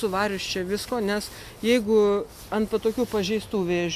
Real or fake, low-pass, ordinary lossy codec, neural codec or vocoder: real; 14.4 kHz; MP3, 96 kbps; none